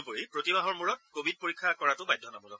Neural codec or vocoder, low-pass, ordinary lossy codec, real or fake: none; none; none; real